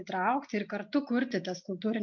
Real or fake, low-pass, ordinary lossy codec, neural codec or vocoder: real; 7.2 kHz; MP3, 64 kbps; none